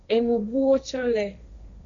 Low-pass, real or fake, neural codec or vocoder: 7.2 kHz; fake; codec, 16 kHz, 1.1 kbps, Voila-Tokenizer